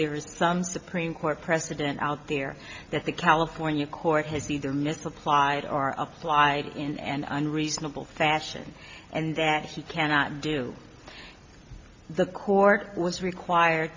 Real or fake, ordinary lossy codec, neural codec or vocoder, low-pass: real; MP3, 48 kbps; none; 7.2 kHz